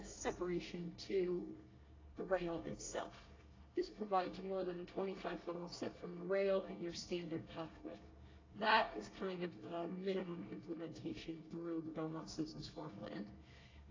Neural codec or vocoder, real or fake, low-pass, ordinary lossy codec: codec, 24 kHz, 1 kbps, SNAC; fake; 7.2 kHz; AAC, 32 kbps